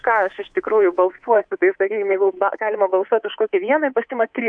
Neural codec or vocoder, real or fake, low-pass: codec, 24 kHz, 3.1 kbps, DualCodec; fake; 9.9 kHz